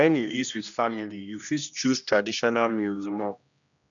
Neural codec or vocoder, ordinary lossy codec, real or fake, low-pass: codec, 16 kHz, 1 kbps, X-Codec, HuBERT features, trained on general audio; none; fake; 7.2 kHz